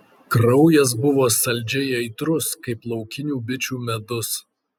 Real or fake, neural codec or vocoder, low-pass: real; none; 19.8 kHz